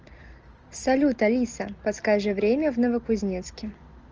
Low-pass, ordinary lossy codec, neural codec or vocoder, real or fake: 7.2 kHz; Opus, 24 kbps; none; real